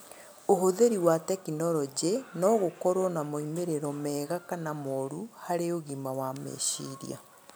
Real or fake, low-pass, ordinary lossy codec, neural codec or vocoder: real; none; none; none